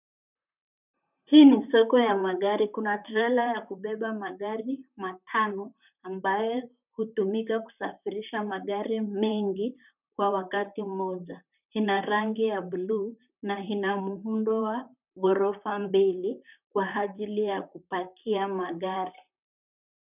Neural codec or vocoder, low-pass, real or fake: vocoder, 44.1 kHz, 128 mel bands, Pupu-Vocoder; 3.6 kHz; fake